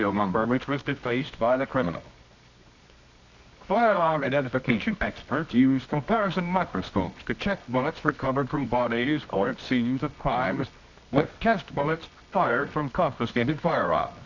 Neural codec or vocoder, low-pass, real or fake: codec, 24 kHz, 0.9 kbps, WavTokenizer, medium music audio release; 7.2 kHz; fake